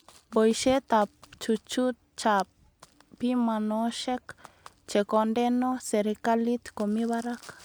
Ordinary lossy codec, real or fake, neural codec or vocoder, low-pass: none; real; none; none